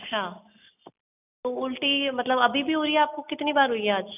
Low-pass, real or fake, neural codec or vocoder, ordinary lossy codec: 3.6 kHz; real; none; none